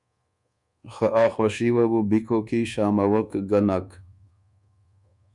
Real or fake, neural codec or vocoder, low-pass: fake; codec, 24 kHz, 1.2 kbps, DualCodec; 10.8 kHz